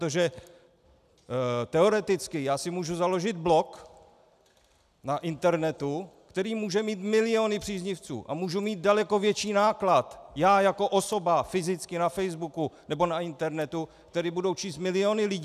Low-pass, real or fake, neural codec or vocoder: 14.4 kHz; real; none